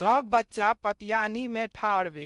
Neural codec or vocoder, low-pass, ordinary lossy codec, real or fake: codec, 16 kHz in and 24 kHz out, 0.6 kbps, FocalCodec, streaming, 2048 codes; 10.8 kHz; none; fake